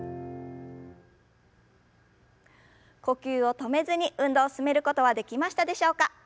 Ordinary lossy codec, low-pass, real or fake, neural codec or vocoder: none; none; real; none